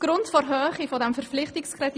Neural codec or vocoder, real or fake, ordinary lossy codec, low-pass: none; real; none; none